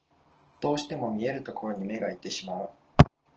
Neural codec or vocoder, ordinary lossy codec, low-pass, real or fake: none; Opus, 16 kbps; 7.2 kHz; real